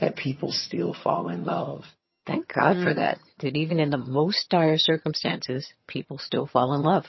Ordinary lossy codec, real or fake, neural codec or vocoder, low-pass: MP3, 24 kbps; fake; vocoder, 22.05 kHz, 80 mel bands, HiFi-GAN; 7.2 kHz